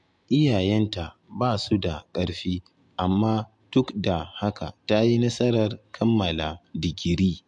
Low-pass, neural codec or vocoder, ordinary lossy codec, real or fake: 10.8 kHz; none; MP3, 64 kbps; real